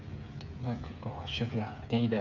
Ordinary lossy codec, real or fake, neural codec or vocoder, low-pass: none; fake; codec, 16 kHz, 8 kbps, FreqCodec, smaller model; 7.2 kHz